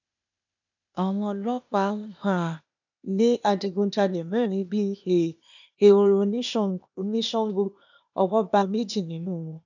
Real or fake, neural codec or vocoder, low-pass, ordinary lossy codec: fake; codec, 16 kHz, 0.8 kbps, ZipCodec; 7.2 kHz; none